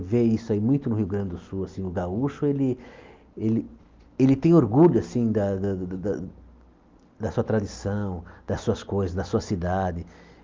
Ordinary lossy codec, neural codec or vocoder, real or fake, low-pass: Opus, 32 kbps; none; real; 7.2 kHz